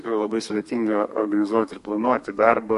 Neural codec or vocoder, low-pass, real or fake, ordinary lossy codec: codec, 32 kHz, 1.9 kbps, SNAC; 14.4 kHz; fake; MP3, 48 kbps